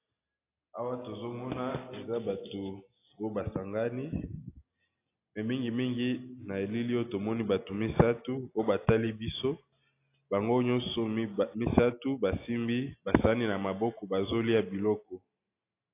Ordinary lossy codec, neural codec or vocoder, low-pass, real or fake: AAC, 24 kbps; none; 3.6 kHz; real